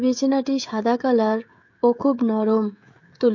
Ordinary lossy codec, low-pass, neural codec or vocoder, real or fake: MP3, 48 kbps; 7.2 kHz; codec, 16 kHz, 16 kbps, FreqCodec, smaller model; fake